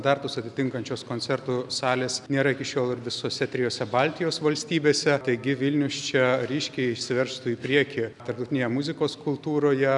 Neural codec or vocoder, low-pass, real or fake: none; 10.8 kHz; real